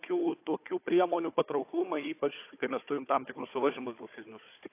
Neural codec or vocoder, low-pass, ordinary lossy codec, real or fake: codec, 16 kHz, 4 kbps, FunCodec, trained on Chinese and English, 50 frames a second; 3.6 kHz; AAC, 24 kbps; fake